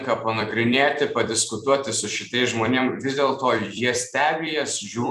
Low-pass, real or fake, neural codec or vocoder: 14.4 kHz; fake; vocoder, 44.1 kHz, 128 mel bands every 256 samples, BigVGAN v2